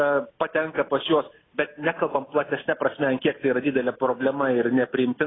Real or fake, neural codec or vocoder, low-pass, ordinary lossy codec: real; none; 7.2 kHz; AAC, 16 kbps